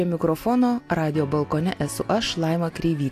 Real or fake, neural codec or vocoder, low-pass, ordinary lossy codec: real; none; 14.4 kHz; AAC, 64 kbps